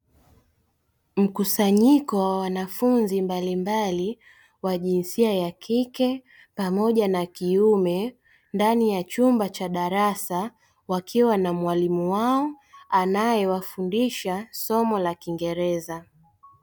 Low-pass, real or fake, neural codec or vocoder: 19.8 kHz; real; none